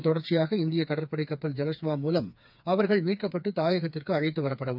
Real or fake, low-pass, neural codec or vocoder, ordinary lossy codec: fake; 5.4 kHz; codec, 16 kHz, 4 kbps, FreqCodec, smaller model; none